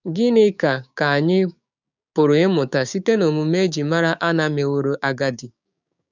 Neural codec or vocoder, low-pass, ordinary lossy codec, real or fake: codec, 16 kHz, 6 kbps, DAC; 7.2 kHz; none; fake